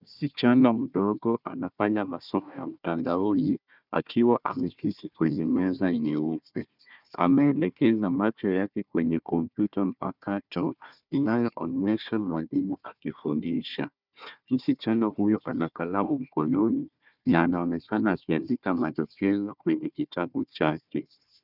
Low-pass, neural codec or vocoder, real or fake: 5.4 kHz; codec, 16 kHz, 1 kbps, FunCodec, trained on Chinese and English, 50 frames a second; fake